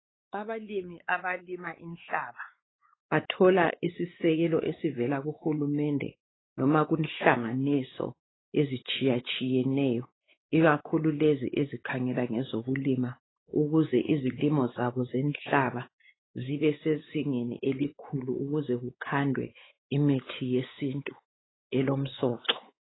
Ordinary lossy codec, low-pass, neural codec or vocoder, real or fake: AAC, 16 kbps; 7.2 kHz; codec, 16 kHz, 4 kbps, X-Codec, WavLM features, trained on Multilingual LibriSpeech; fake